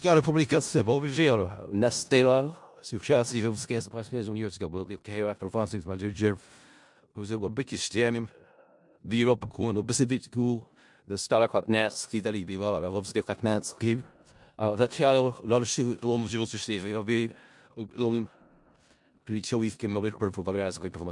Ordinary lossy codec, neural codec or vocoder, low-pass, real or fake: MP3, 64 kbps; codec, 16 kHz in and 24 kHz out, 0.4 kbps, LongCat-Audio-Codec, four codebook decoder; 10.8 kHz; fake